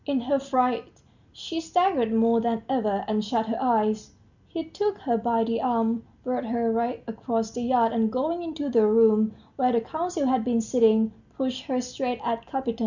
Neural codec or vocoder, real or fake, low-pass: none; real; 7.2 kHz